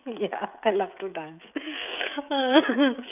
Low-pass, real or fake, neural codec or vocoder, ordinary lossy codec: 3.6 kHz; fake; codec, 16 kHz, 16 kbps, FreqCodec, smaller model; none